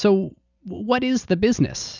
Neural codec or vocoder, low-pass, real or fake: none; 7.2 kHz; real